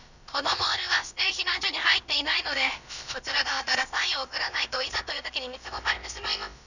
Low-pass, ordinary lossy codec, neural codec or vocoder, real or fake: 7.2 kHz; none; codec, 16 kHz, about 1 kbps, DyCAST, with the encoder's durations; fake